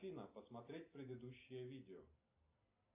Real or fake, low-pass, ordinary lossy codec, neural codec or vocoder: real; 3.6 kHz; AAC, 32 kbps; none